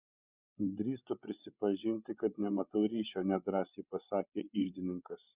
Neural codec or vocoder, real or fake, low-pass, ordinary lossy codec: codec, 16 kHz, 8 kbps, FreqCodec, larger model; fake; 3.6 kHz; Opus, 64 kbps